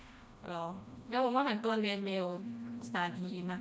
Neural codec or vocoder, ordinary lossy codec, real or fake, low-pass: codec, 16 kHz, 1 kbps, FreqCodec, smaller model; none; fake; none